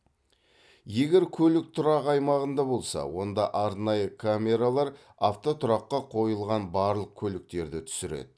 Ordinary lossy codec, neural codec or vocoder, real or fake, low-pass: none; none; real; none